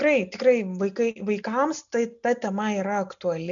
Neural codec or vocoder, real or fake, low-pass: none; real; 7.2 kHz